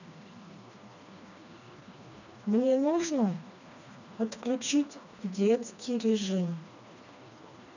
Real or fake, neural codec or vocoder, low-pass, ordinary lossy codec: fake; codec, 16 kHz, 2 kbps, FreqCodec, smaller model; 7.2 kHz; none